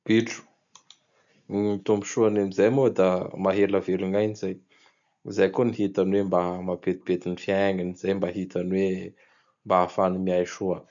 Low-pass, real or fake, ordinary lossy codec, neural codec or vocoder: 7.2 kHz; real; none; none